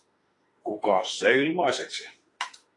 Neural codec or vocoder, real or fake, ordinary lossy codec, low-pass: autoencoder, 48 kHz, 32 numbers a frame, DAC-VAE, trained on Japanese speech; fake; AAC, 32 kbps; 10.8 kHz